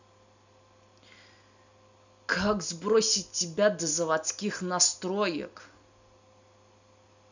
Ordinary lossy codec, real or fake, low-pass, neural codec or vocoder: none; real; 7.2 kHz; none